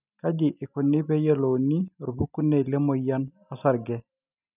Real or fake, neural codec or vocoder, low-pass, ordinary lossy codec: real; none; 3.6 kHz; none